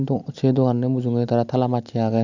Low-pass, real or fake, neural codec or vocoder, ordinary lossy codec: 7.2 kHz; real; none; none